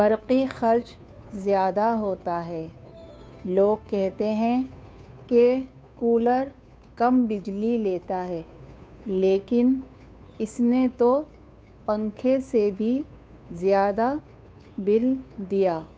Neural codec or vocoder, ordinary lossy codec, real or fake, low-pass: codec, 16 kHz, 2 kbps, FunCodec, trained on Chinese and English, 25 frames a second; none; fake; none